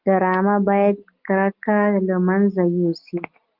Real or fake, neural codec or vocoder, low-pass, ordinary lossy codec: real; none; 5.4 kHz; Opus, 32 kbps